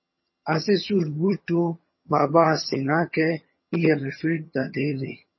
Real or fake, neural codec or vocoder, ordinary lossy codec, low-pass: fake; vocoder, 22.05 kHz, 80 mel bands, HiFi-GAN; MP3, 24 kbps; 7.2 kHz